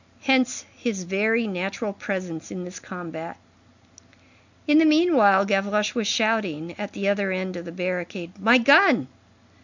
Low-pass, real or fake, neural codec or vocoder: 7.2 kHz; real; none